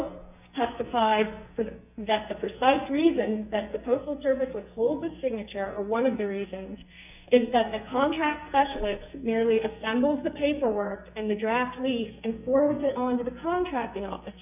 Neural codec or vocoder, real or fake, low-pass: codec, 44.1 kHz, 2.6 kbps, SNAC; fake; 3.6 kHz